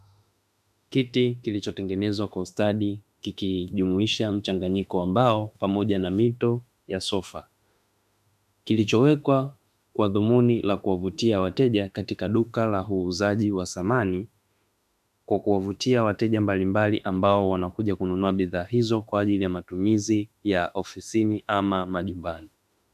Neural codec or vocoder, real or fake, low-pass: autoencoder, 48 kHz, 32 numbers a frame, DAC-VAE, trained on Japanese speech; fake; 14.4 kHz